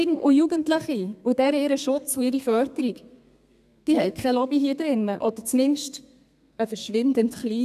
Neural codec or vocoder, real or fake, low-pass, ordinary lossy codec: codec, 32 kHz, 1.9 kbps, SNAC; fake; 14.4 kHz; none